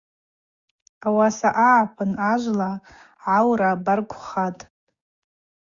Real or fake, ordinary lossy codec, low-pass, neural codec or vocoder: fake; Opus, 64 kbps; 7.2 kHz; codec, 16 kHz, 6 kbps, DAC